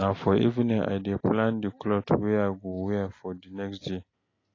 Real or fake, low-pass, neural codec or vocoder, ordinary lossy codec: real; 7.2 kHz; none; AAC, 32 kbps